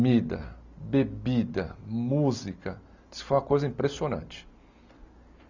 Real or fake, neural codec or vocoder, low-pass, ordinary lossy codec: real; none; 7.2 kHz; none